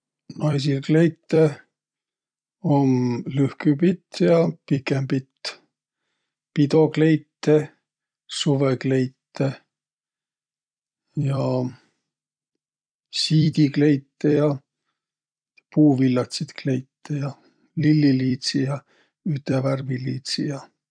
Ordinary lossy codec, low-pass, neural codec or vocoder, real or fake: none; 9.9 kHz; vocoder, 44.1 kHz, 128 mel bands every 256 samples, BigVGAN v2; fake